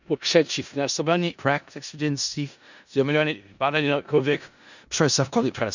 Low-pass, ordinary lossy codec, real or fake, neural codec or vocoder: 7.2 kHz; none; fake; codec, 16 kHz in and 24 kHz out, 0.4 kbps, LongCat-Audio-Codec, four codebook decoder